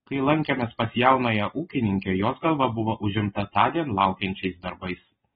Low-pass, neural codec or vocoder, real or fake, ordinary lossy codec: 19.8 kHz; none; real; AAC, 16 kbps